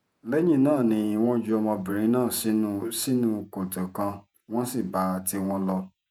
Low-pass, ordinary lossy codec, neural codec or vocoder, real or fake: none; none; vocoder, 48 kHz, 128 mel bands, Vocos; fake